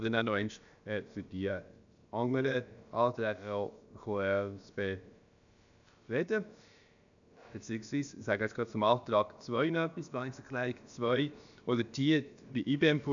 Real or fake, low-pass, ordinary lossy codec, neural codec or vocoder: fake; 7.2 kHz; none; codec, 16 kHz, about 1 kbps, DyCAST, with the encoder's durations